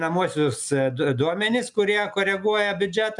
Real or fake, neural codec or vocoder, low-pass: real; none; 10.8 kHz